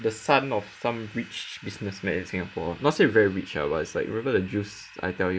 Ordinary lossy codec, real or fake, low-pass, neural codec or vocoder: none; real; none; none